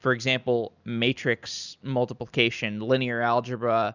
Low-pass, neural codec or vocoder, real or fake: 7.2 kHz; none; real